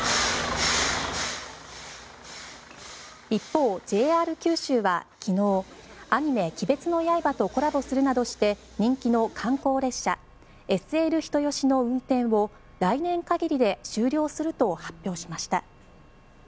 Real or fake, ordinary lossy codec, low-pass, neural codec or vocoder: real; none; none; none